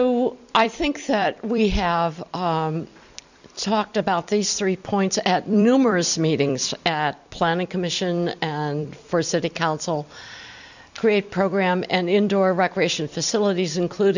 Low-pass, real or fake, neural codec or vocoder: 7.2 kHz; fake; vocoder, 44.1 kHz, 128 mel bands every 256 samples, BigVGAN v2